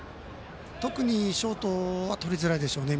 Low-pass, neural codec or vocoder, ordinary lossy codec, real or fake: none; none; none; real